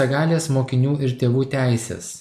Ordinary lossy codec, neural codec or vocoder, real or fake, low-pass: MP3, 96 kbps; none; real; 14.4 kHz